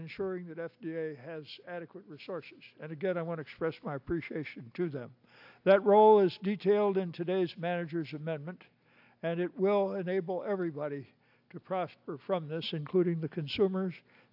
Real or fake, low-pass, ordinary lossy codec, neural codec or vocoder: real; 5.4 kHz; AAC, 48 kbps; none